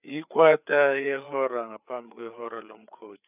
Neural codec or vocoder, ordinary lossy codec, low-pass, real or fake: codec, 16 kHz, 4 kbps, FreqCodec, larger model; none; 3.6 kHz; fake